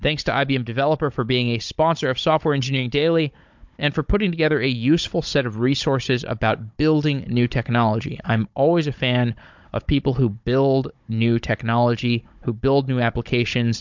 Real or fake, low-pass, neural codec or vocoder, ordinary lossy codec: fake; 7.2 kHz; codec, 16 kHz, 16 kbps, FunCodec, trained on Chinese and English, 50 frames a second; MP3, 64 kbps